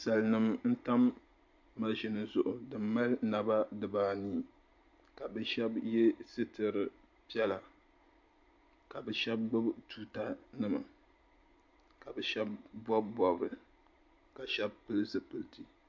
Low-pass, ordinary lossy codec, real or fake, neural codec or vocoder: 7.2 kHz; MP3, 48 kbps; real; none